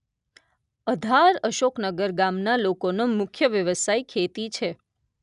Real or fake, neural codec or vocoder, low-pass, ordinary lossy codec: real; none; 10.8 kHz; none